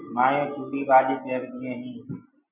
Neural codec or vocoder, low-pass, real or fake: none; 3.6 kHz; real